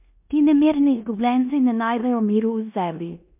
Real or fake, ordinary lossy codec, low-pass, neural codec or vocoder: fake; MP3, 32 kbps; 3.6 kHz; codec, 16 kHz in and 24 kHz out, 0.9 kbps, LongCat-Audio-Codec, four codebook decoder